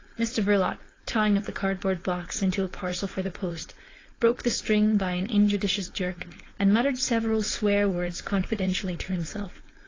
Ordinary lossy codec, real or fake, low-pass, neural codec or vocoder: AAC, 32 kbps; fake; 7.2 kHz; codec, 16 kHz, 4.8 kbps, FACodec